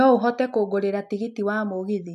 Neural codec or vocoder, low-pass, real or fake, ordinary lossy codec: none; 14.4 kHz; real; none